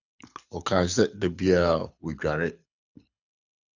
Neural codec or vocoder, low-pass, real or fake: codec, 24 kHz, 6 kbps, HILCodec; 7.2 kHz; fake